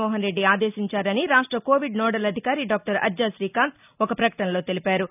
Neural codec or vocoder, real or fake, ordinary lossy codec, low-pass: none; real; none; 3.6 kHz